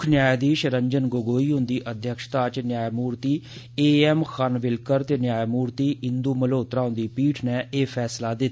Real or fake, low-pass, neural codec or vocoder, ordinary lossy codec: real; none; none; none